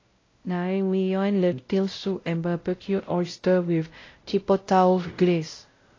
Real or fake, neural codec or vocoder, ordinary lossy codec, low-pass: fake; codec, 16 kHz, 0.5 kbps, X-Codec, WavLM features, trained on Multilingual LibriSpeech; AAC, 32 kbps; 7.2 kHz